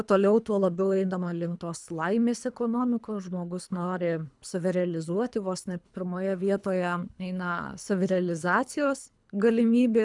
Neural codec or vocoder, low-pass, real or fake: codec, 24 kHz, 3 kbps, HILCodec; 10.8 kHz; fake